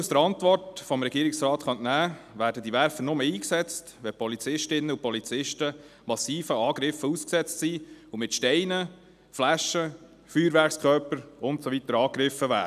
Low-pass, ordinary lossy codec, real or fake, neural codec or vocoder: 14.4 kHz; none; real; none